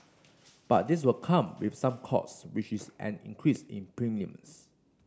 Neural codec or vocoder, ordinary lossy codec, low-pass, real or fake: none; none; none; real